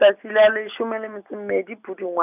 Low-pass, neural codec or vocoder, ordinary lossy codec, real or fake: 3.6 kHz; none; none; real